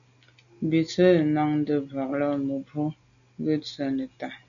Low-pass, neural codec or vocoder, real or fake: 7.2 kHz; none; real